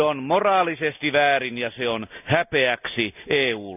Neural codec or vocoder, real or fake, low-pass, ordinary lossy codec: none; real; 3.6 kHz; none